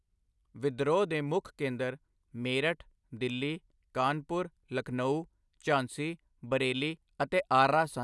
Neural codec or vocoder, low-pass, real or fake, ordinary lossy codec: none; none; real; none